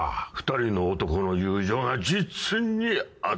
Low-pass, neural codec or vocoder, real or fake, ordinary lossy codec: none; none; real; none